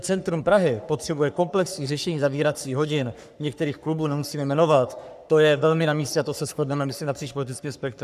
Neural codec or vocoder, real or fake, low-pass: codec, 44.1 kHz, 3.4 kbps, Pupu-Codec; fake; 14.4 kHz